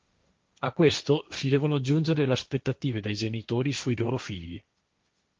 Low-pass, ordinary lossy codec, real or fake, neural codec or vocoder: 7.2 kHz; Opus, 24 kbps; fake; codec, 16 kHz, 1.1 kbps, Voila-Tokenizer